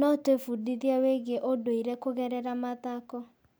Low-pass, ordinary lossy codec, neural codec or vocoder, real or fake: none; none; none; real